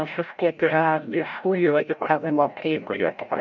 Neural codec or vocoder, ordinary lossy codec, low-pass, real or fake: codec, 16 kHz, 0.5 kbps, FreqCodec, larger model; MP3, 64 kbps; 7.2 kHz; fake